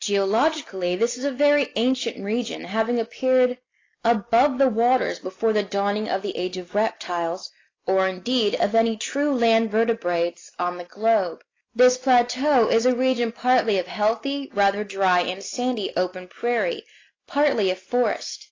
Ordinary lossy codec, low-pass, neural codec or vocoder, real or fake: AAC, 32 kbps; 7.2 kHz; none; real